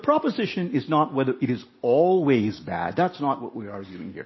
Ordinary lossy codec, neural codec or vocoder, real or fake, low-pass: MP3, 24 kbps; none; real; 7.2 kHz